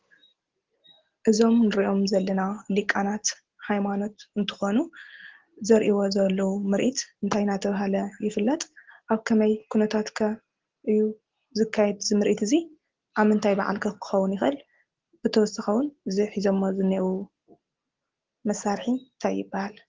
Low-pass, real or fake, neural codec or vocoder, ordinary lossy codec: 7.2 kHz; real; none; Opus, 16 kbps